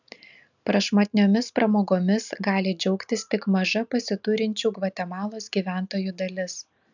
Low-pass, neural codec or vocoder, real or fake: 7.2 kHz; none; real